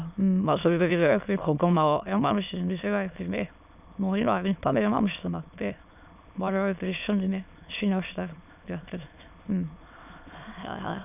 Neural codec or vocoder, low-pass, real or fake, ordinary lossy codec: autoencoder, 22.05 kHz, a latent of 192 numbers a frame, VITS, trained on many speakers; 3.6 kHz; fake; none